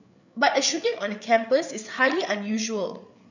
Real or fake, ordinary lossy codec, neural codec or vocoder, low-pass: fake; none; codec, 16 kHz, 8 kbps, FreqCodec, larger model; 7.2 kHz